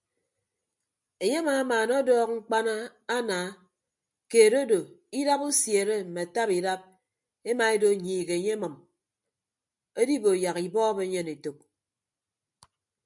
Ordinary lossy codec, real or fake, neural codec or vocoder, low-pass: MP3, 96 kbps; real; none; 10.8 kHz